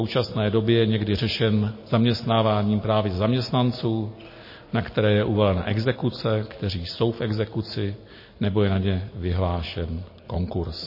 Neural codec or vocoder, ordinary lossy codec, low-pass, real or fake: none; MP3, 24 kbps; 5.4 kHz; real